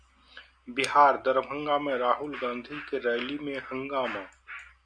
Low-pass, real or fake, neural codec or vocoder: 9.9 kHz; real; none